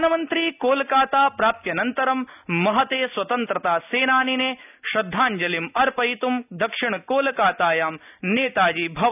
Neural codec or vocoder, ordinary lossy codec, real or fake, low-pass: none; none; real; 3.6 kHz